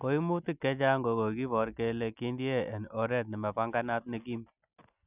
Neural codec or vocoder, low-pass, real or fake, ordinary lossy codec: none; 3.6 kHz; real; none